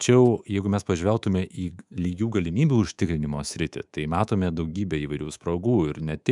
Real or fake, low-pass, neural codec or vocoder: fake; 10.8 kHz; codec, 24 kHz, 3.1 kbps, DualCodec